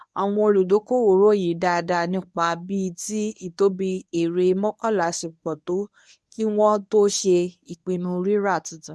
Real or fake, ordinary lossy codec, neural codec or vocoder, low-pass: fake; none; codec, 24 kHz, 0.9 kbps, WavTokenizer, medium speech release version 1; none